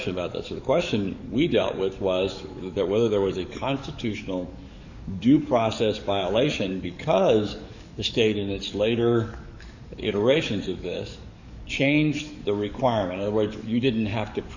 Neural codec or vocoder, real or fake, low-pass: codec, 44.1 kHz, 7.8 kbps, DAC; fake; 7.2 kHz